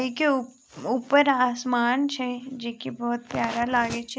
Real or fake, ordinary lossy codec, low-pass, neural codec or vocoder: real; none; none; none